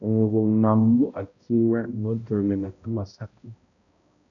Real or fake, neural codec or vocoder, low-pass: fake; codec, 16 kHz, 0.5 kbps, X-Codec, HuBERT features, trained on balanced general audio; 7.2 kHz